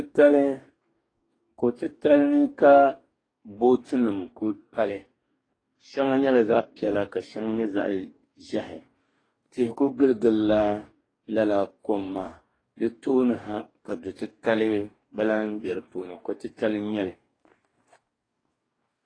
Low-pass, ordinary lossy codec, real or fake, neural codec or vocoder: 9.9 kHz; AAC, 32 kbps; fake; codec, 44.1 kHz, 2.6 kbps, DAC